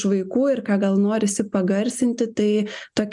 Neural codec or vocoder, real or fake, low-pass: none; real; 10.8 kHz